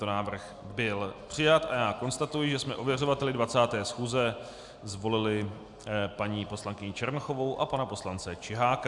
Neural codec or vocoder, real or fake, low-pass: none; real; 10.8 kHz